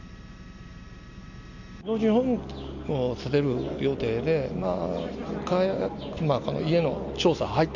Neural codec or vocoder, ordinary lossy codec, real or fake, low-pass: none; none; real; 7.2 kHz